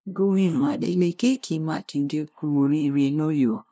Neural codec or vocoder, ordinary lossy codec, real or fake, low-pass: codec, 16 kHz, 0.5 kbps, FunCodec, trained on LibriTTS, 25 frames a second; none; fake; none